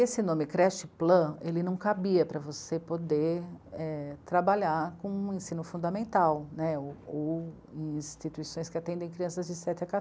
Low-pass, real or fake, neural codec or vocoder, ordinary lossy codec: none; real; none; none